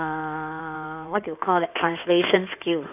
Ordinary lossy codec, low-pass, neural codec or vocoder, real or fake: none; 3.6 kHz; codec, 16 kHz in and 24 kHz out, 2.2 kbps, FireRedTTS-2 codec; fake